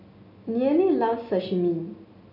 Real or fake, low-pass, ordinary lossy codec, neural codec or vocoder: real; 5.4 kHz; none; none